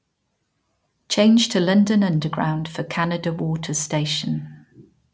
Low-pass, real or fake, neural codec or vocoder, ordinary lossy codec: none; real; none; none